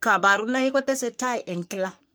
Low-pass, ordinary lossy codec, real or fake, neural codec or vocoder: none; none; fake; codec, 44.1 kHz, 3.4 kbps, Pupu-Codec